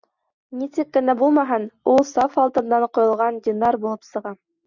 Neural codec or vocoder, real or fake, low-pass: none; real; 7.2 kHz